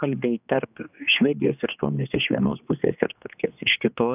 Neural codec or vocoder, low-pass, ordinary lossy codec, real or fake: codec, 16 kHz, 2 kbps, X-Codec, HuBERT features, trained on general audio; 3.6 kHz; AAC, 32 kbps; fake